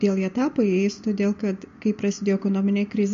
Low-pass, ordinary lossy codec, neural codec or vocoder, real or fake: 7.2 kHz; MP3, 48 kbps; none; real